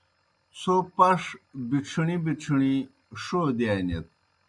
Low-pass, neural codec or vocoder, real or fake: 10.8 kHz; none; real